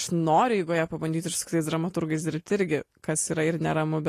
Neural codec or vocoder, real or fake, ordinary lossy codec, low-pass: none; real; AAC, 48 kbps; 14.4 kHz